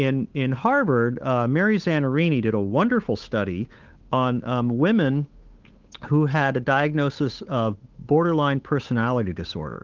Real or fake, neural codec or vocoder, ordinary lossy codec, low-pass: fake; codec, 16 kHz, 8 kbps, FunCodec, trained on Chinese and English, 25 frames a second; Opus, 24 kbps; 7.2 kHz